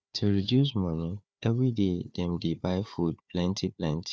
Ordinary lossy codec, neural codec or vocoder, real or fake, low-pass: none; codec, 16 kHz, 4 kbps, FunCodec, trained on Chinese and English, 50 frames a second; fake; none